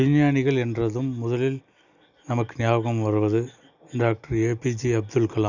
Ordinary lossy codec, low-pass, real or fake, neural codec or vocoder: none; 7.2 kHz; real; none